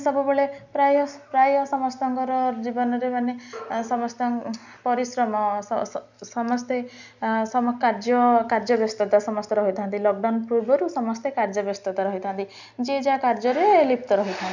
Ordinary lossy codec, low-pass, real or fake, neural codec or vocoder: none; 7.2 kHz; real; none